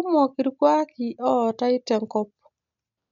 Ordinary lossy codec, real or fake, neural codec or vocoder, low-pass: none; real; none; 7.2 kHz